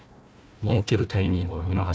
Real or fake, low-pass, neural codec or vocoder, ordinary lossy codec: fake; none; codec, 16 kHz, 1 kbps, FunCodec, trained on Chinese and English, 50 frames a second; none